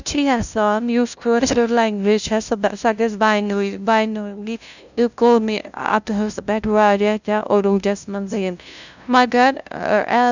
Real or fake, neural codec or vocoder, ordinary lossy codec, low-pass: fake; codec, 16 kHz, 0.5 kbps, FunCodec, trained on LibriTTS, 25 frames a second; none; 7.2 kHz